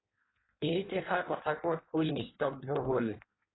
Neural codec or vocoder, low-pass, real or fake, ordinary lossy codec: codec, 16 kHz in and 24 kHz out, 1.1 kbps, FireRedTTS-2 codec; 7.2 kHz; fake; AAC, 16 kbps